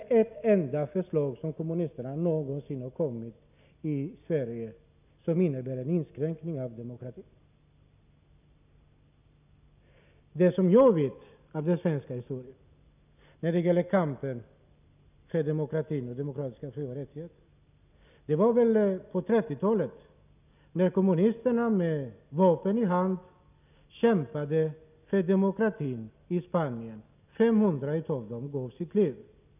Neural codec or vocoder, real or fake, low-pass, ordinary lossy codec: none; real; 3.6 kHz; none